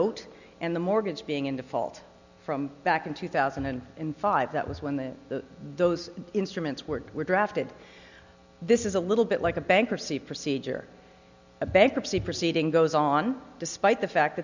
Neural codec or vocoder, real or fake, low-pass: none; real; 7.2 kHz